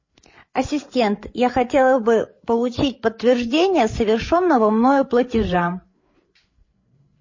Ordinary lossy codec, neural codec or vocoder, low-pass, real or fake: MP3, 32 kbps; codec, 16 kHz, 8 kbps, FreqCodec, larger model; 7.2 kHz; fake